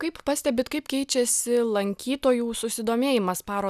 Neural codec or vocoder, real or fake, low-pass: none; real; 14.4 kHz